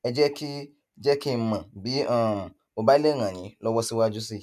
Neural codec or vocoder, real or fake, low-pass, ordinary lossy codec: none; real; 14.4 kHz; none